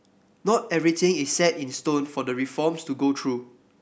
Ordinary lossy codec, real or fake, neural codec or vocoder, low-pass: none; real; none; none